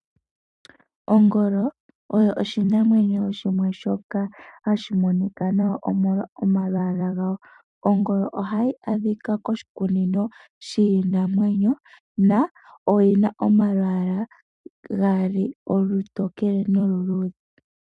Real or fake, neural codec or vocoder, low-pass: fake; vocoder, 44.1 kHz, 128 mel bands every 256 samples, BigVGAN v2; 10.8 kHz